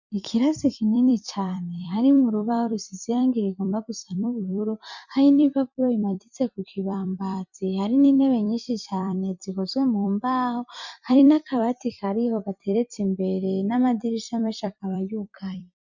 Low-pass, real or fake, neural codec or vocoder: 7.2 kHz; fake; vocoder, 44.1 kHz, 128 mel bands every 256 samples, BigVGAN v2